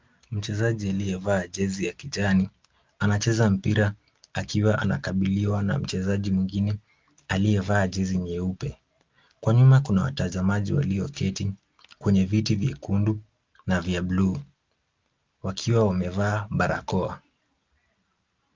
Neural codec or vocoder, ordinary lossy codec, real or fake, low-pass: none; Opus, 32 kbps; real; 7.2 kHz